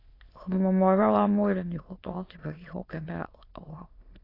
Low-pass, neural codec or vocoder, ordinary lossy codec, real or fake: 5.4 kHz; autoencoder, 22.05 kHz, a latent of 192 numbers a frame, VITS, trained on many speakers; AAC, 24 kbps; fake